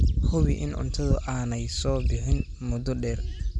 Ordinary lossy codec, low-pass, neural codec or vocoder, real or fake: none; 10.8 kHz; none; real